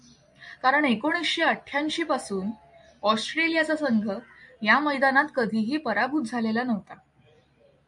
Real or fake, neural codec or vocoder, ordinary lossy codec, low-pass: real; none; MP3, 64 kbps; 10.8 kHz